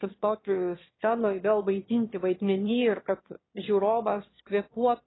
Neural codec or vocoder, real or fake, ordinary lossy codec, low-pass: autoencoder, 22.05 kHz, a latent of 192 numbers a frame, VITS, trained on one speaker; fake; AAC, 16 kbps; 7.2 kHz